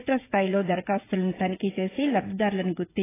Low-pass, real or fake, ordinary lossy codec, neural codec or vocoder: 3.6 kHz; fake; AAC, 16 kbps; codec, 16 kHz, 16 kbps, FreqCodec, smaller model